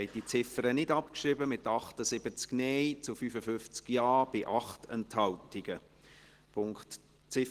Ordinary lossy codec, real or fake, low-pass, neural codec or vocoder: Opus, 16 kbps; real; 14.4 kHz; none